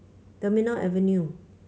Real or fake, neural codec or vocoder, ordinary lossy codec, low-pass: real; none; none; none